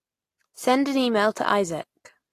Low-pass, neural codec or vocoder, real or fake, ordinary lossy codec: 14.4 kHz; none; real; AAC, 48 kbps